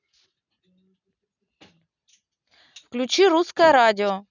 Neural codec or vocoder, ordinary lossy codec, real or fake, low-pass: none; none; real; 7.2 kHz